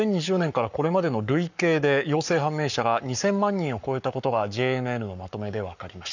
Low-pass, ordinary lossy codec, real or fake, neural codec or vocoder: 7.2 kHz; none; fake; codec, 44.1 kHz, 7.8 kbps, Pupu-Codec